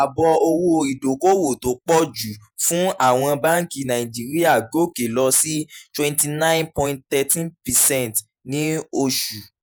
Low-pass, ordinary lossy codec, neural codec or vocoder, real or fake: none; none; vocoder, 48 kHz, 128 mel bands, Vocos; fake